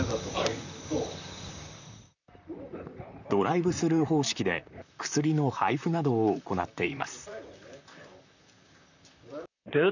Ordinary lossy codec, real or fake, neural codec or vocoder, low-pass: none; fake; vocoder, 44.1 kHz, 128 mel bands, Pupu-Vocoder; 7.2 kHz